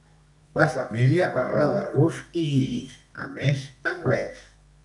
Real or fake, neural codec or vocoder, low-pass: fake; codec, 24 kHz, 0.9 kbps, WavTokenizer, medium music audio release; 10.8 kHz